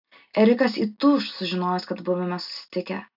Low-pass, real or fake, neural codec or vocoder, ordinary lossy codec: 5.4 kHz; real; none; AAC, 48 kbps